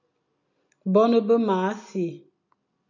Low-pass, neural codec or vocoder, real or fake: 7.2 kHz; none; real